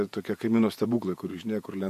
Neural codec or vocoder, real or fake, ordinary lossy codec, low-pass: vocoder, 48 kHz, 128 mel bands, Vocos; fake; MP3, 64 kbps; 14.4 kHz